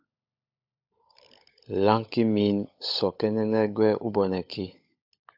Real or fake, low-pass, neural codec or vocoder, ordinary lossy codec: fake; 5.4 kHz; codec, 16 kHz, 16 kbps, FunCodec, trained on LibriTTS, 50 frames a second; AAC, 48 kbps